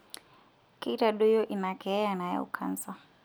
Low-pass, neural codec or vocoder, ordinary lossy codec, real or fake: none; none; none; real